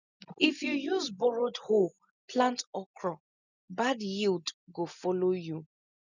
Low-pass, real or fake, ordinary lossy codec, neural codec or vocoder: 7.2 kHz; real; none; none